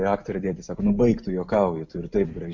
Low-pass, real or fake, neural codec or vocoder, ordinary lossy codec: 7.2 kHz; real; none; MP3, 48 kbps